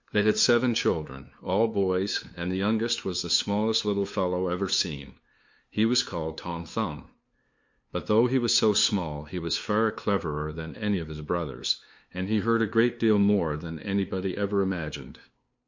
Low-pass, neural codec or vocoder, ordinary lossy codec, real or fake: 7.2 kHz; codec, 16 kHz, 2 kbps, FunCodec, trained on LibriTTS, 25 frames a second; MP3, 48 kbps; fake